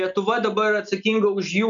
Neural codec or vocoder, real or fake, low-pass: none; real; 7.2 kHz